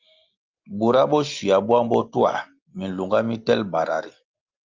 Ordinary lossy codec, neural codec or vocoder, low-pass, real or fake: Opus, 24 kbps; none; 7.2 kHz; real